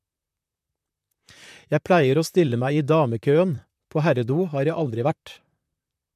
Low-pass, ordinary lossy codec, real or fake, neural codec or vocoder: 14.4 kHz; MP3, 96 kbps; fake; vocoder, 44.1 kHz, 128 mel bands, Pupu-Vocoder